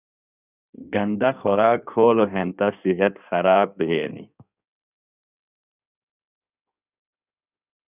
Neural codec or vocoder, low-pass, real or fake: codec, 16 kHz in and 24 kHz out, 1.1 kbps, FireRedTTS-2 codec; 3.6 kHz; fake